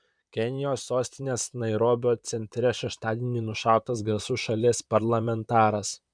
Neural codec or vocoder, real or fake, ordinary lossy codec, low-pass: vocoder, 44.1 kHz, 128 mel bands, Pupu-Vocoder; fake; MP3, 96 kbps; 9.9 kHz